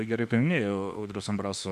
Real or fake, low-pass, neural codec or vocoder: fake; 14.4 kHz; autoencoder, 48 kHz, 32 numbers a frame, DAC-VAE, trained on Japanese speech